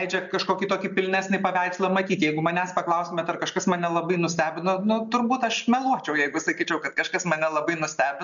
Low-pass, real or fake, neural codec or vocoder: 7.2 kHz; real; none